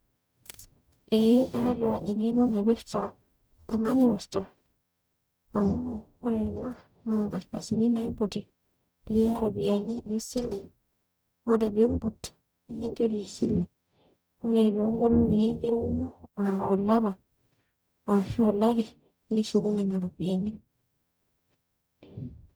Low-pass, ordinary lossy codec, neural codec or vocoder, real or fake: none; none; codec, 44.1 kHz, 0.9 kbps, DAC; fake